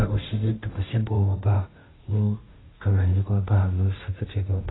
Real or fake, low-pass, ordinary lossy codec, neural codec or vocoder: fake; 7.2 kHz; AAC, 16 kbps; codec, 16 kHz, 0.5 kbps, FunCodec, trained on Chinese and English, 25 frames a second